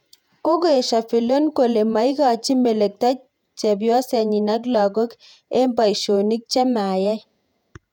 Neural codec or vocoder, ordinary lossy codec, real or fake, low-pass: vocoder, 48 kHz, 128 mel bands, Vocos; none; fake; 19.8 kHz